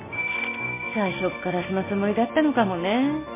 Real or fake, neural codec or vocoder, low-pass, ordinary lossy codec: real; none; 3.6 kHz; MP3, 24 kbps